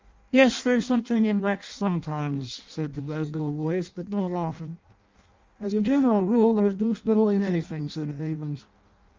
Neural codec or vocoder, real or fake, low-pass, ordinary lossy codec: codec, 16 kHz in and 24 kHz out, 0.6 kbps, FireRedTTS-2 codec; fake; 7.2 kHz; Opus, 32 kbps